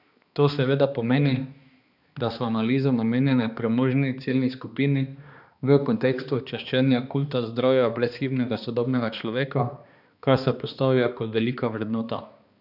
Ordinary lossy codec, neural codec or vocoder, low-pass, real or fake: none; codec, 16 kHz, 2 kbps, X-Codec, HuBERT features, trained on balanced general audio; 5.4 kHz; fake